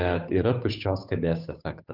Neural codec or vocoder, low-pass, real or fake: codec, 16 kHz, 8 kbps, FunCodec, trained on Chinese and English, 25 frames a second; 5.4 kHz; fake